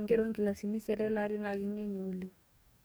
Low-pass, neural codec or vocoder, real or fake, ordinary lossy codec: none; codec, 44.1 kHz, 2.6 kbps, DAC; fake; none